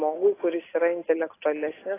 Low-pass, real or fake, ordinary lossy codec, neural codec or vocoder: 3.6 kHz; real; AAC, 16 kbps; none